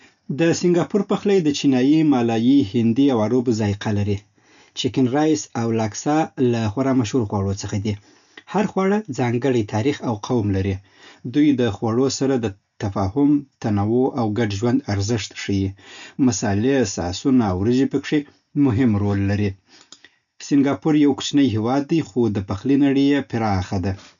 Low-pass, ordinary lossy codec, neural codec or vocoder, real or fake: 7.2 kHz; none; none; real